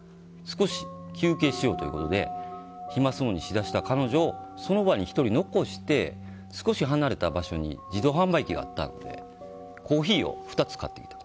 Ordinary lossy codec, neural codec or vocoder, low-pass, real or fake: none; none; none; real